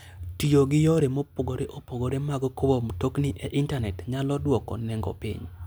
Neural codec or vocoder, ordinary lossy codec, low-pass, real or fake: none; none; none; real